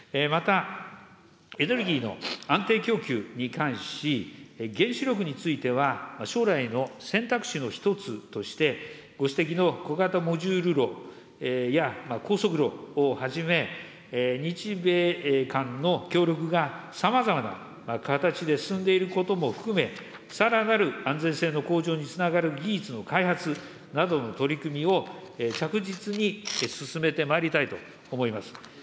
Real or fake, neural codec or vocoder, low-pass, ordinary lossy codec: real; none; none; none